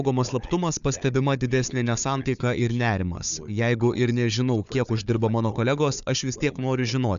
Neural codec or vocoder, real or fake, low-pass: codec, 16 kHz, 4 kbps, FunCodec, trained on Chinese and English, 50 frames a second; fake; 7.2 kHz